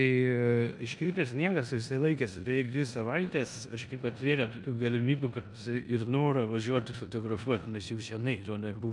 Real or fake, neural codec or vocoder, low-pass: fake; codec, 16 kHz in and 24 kHz out, 0.9 kbps, LongCat-Audio-Codec, four codebook decoder; 10.8 kHz